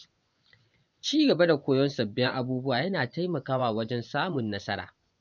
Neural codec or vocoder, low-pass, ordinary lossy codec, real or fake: vocoder, 24 kHz, 100 mel bands, Vocos; 7.2 kHz; none; fake